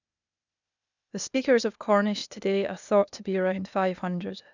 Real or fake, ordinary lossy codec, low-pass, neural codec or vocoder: fake; none; 7.2 kHz; codec, 16 kHz, 0.8 kbps, ZipCodec